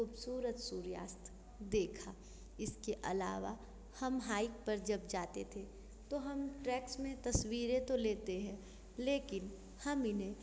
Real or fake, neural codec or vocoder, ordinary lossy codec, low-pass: real; none; none; none